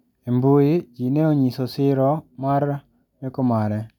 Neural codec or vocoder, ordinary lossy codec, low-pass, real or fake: none; none; 19.8 kHz; real